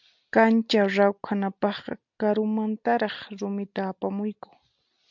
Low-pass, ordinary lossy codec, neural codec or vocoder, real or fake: 7.2 kHz; MP3, 64 kbps; none; real